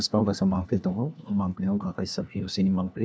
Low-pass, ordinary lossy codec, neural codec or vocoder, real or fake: none; none; codec, 16 kHz, 1 kbps, FunCodec, trained on LibriTTS, 50 frames a second; fake